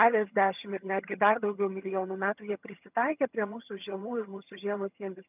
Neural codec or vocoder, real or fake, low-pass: vocoder, 22.05 kHz, 80 mel bands, HiFi-GAN; fake; 3.6 kHz